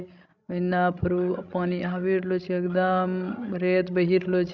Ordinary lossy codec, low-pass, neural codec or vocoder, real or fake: none; 7.2 kHz; codec, 16 kHz, 16 kbps, FreqCodec, larger model; fake